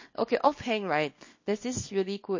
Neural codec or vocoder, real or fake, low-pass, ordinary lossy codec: codec, 24 kHz, 0.9 kbps, WavTokenizer, small release; fake; 7.2 kHz; MP3, 32 kbps